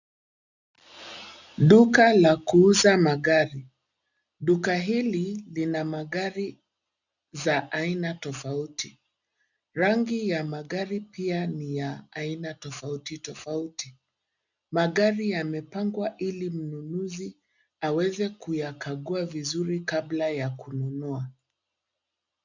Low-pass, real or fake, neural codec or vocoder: 7.2 kHz; real; none